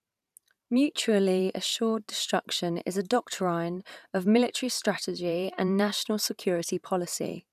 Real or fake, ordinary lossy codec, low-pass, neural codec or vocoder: fake; none; 14.4 kHz; vocoder, 44.1 kHz, 128 mel bands every 512 samples, BigVGAN v2